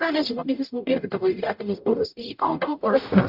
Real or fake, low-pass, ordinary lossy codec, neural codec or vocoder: fake; 5.4 kHz; AAC, 48 kbps; codec, 44.1 kHz, 0.9 kbps, DAC